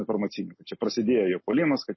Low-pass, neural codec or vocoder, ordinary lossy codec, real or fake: 7.2 kHz; none; MP3, 24 kbps; real